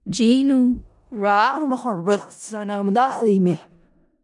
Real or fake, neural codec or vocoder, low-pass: fake; codec, 16 kHz in and 24 kHz out, 0.4 kbps, LongCat-Audio-Codec, four codebook decoder; 10.8 kHz